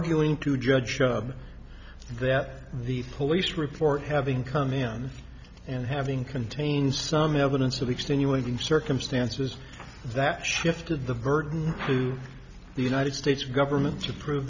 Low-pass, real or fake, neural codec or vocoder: 7.2 kHz; real; none